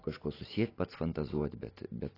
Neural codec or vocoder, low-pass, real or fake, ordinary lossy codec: none; 5.4 kHz; real; AAC, 24 kbps